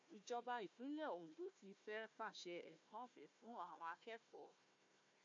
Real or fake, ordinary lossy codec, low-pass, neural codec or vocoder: fake; AAC, 48 kbps; 7.2 kHz; codec, 16 kHz, 1 kbps, FunCodec, trained on Chinese and English, 50 frames a second